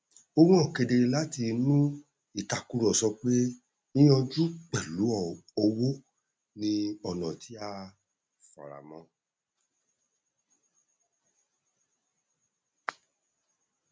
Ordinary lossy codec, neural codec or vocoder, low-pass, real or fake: none; none; none; real